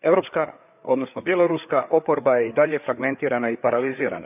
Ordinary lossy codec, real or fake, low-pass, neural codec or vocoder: none; fake; 3.6 kHz; codec, 16 kHz, 4 kbps, FreqCodec, larger model